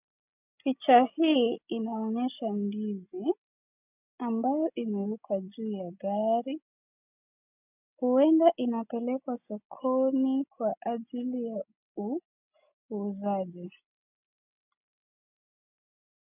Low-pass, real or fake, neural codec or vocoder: 3.6 kHz; real; none